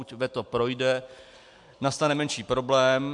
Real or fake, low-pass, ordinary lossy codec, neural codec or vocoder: real; 10.8 kHz; MP3, 64 kbps; none